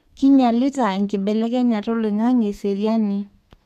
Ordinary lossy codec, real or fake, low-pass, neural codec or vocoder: none; fake; 14.4 kHz; codec, 32 kHz, 1.9 kbps, SNAC